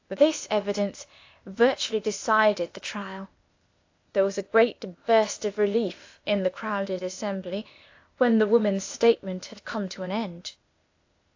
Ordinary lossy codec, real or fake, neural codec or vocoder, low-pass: AAC, 48 kbps; fake; codec, 16 kHz, 0.8 kbps, ZipCodec; 7.2 kHz